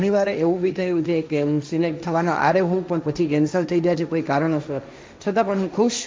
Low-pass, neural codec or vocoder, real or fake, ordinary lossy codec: none; codec, 16 kHz, 1.1 kbps, Voila-Tokenizer; fake; none